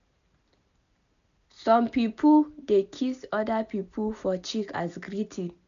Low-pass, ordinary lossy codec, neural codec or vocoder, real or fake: 7.2 kHz; none; none; real